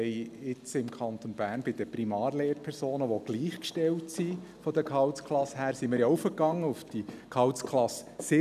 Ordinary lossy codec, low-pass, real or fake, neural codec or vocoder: none; 14.4 kHz; real; none